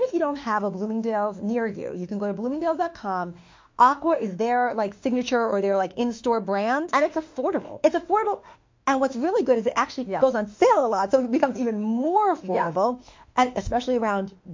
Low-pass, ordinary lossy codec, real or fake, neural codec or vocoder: 7.2 kHz; MP3, 48 kbps; fake; autoencoder, 48 kHz, 32 numbers a frame, DAC-VAE, trained on Japanese speech